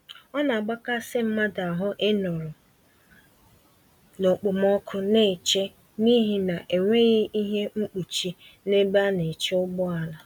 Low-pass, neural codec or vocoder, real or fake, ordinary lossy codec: 19.8 kHz; none; real; none